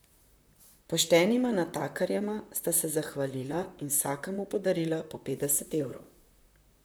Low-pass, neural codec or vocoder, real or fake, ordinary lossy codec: none; vocoder, 44.1 kHz, 128 mel bands, Pupu-Vocoder; fake; none